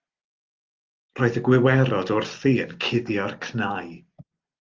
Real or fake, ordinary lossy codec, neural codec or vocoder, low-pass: real; Opus, 32 kbps; none; 7.2 kHz